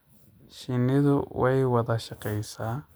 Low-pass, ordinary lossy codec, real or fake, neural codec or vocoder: none; none; real; none